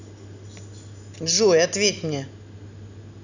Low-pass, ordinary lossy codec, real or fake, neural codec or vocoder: 7.2 kHz; none; real; none